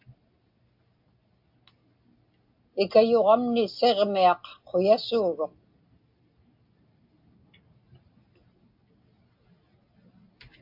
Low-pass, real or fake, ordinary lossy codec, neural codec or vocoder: 5.4 kHz; real; AAC, 48 kbps; none